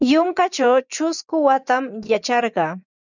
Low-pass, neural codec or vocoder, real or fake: 7.2 kHz; vocoder, 24 kHz, 100 mel bands, Vocos; fake